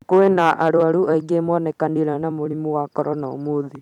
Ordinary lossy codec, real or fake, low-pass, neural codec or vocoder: none; fake; 19.8 kHz; vocoder, 44.1 kHz, 128 mel bands every 256 samples, BigVGAN v2